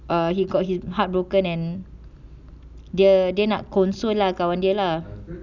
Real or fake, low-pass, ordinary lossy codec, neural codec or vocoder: real; 7.2 kHz; none; none